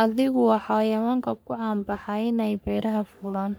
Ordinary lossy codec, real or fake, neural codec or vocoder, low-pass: none; fake; codec, 44.1 kHz, 3.4 kbps, Pupu-Codec; none